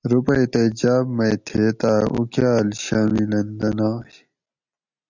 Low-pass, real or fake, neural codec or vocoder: 7.2 kHz; real; none